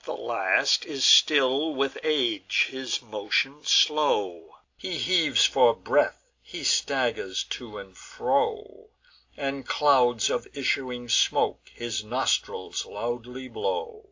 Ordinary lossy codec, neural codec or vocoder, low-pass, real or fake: AAC, 48 kbps; none; 7.2 kHz; real